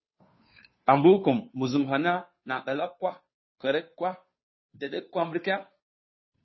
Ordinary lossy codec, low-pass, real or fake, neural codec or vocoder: MP3, 24 kbps; 7.2 kHz; fake; codec, 16 kHz, 2 kbps, FunCodec, trained on Chinese and English, 25 frames a second